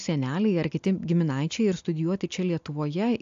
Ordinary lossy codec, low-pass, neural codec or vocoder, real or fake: AAC, 64 kbps; 7.2 kHz; none; real